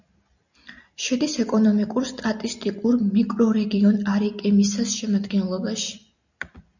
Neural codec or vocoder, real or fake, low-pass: none; real; 7.2 kHz